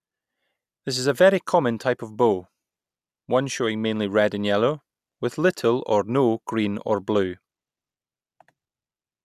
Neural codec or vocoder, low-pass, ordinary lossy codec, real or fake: none; 14.4 kHz; none; real